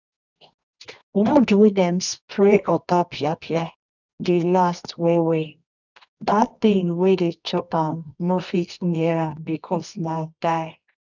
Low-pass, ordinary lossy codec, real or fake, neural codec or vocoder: 7.2 kHz; none; fake; codec, 24 kHz, 0.9 kbps, WavTokenizer, medium music audio release